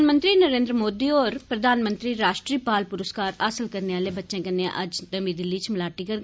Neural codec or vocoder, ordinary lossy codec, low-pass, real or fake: none; none; none; real